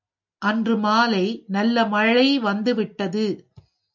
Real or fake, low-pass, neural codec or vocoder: real; 7.2 kHz; none